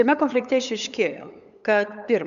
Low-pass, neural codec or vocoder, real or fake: 7.2 kHz; codec, 16 kHz, 8 kbps, FunCodec, trained on LibriTTS, 25 frames a second; fake